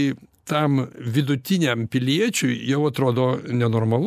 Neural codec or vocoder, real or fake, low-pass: none; real; 14.4 kHz